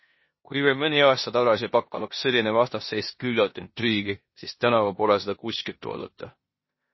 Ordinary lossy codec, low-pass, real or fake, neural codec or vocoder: MP3, 24 kbps; 7.2 kHz; fake; codec, 16 kHz in and 24 kHz out, 0.9 kbps, LongCat-Audio-Codec, four codebook decoder